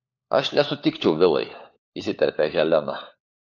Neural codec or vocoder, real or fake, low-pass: codec, 16 kHz, 4 kbps, FunCodec, trained on LibriTTS, 50 frames a second; fake; 7.2 kHz